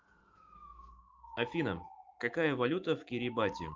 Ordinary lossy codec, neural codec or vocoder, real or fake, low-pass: Opus, 24 kbps; autoencoder, 48 kHz, 128 numbers a frame, DAC-VAE, trained on Japanese speech; fake; 7.2 kHz